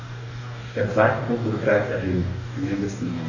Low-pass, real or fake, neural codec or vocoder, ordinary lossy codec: 7.2 kHz; fake; codec, 44.1 kHz, 2.6 kbps, DAC; none